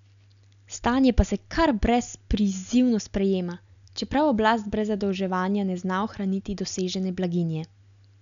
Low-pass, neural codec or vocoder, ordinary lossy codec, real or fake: 7.2 kHz; none; none; real